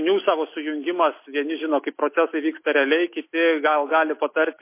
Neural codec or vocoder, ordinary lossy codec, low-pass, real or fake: none; MP3, 24 kbps; 3.6 kHz; real